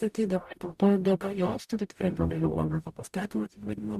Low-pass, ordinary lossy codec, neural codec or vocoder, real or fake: 14.4 kHz; Opus, 64 kbps; codec, 44.1 kHz, 0.9 kbps, DAC; fake